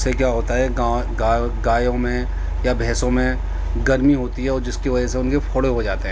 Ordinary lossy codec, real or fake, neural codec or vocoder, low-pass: none; real; none; none